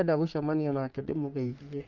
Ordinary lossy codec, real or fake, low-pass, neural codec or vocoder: Opus, 32 kbps; fake; 7.2 kHz; codec, 44.1 kHz, 3.4 kbps, Pupu-Codec